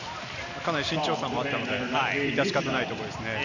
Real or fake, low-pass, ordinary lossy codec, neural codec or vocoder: real; 7.2 kHz; none; none